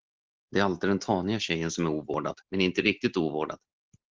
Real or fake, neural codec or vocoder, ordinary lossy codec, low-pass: real; none; Opus, 16 kbps; 7.2 kHz